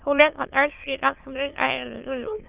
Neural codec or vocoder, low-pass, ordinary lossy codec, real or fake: autoencoder, 22.05 kHz, a latent of 192 numbers a frame, VITS, trained on many speakers; 3.6 kHz; Opus, 24 kbps; fake